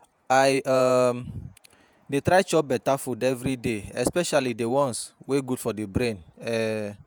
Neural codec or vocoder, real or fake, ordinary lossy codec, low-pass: vocoder, 48 kHz, 128 mel bands, Vocos; fake; none; none